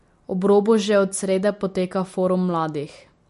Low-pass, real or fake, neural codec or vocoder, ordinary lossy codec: 14.4 kHz; real; none; MP3, 48 kbps